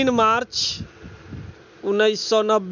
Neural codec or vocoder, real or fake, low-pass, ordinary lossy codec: none; real; 7.2 kHz; none